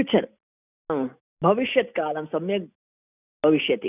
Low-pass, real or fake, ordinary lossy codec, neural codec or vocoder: 3.6 kHz; real; none; none